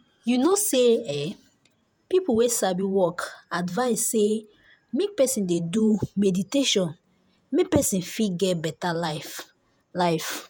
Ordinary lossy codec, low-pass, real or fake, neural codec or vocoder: none; none; fake; vocoder, 48 kHz, 128 mel bands, Vocos